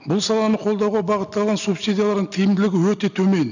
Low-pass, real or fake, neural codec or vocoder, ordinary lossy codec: 7.2 kHz; real; none; none